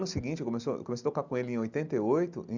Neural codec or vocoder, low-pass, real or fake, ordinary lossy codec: none; 7.2 kHz; real; none